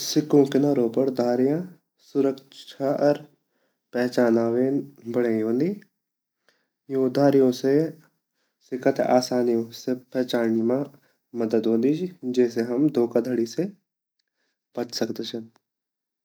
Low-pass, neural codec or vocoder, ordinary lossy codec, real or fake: none; none; none; real